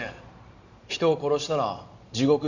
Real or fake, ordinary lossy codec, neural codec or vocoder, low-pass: fake; none; vocoder, 44.1 kHz, 128 mel bands every 512 samples, BigVGAN v2; 7.2 kHz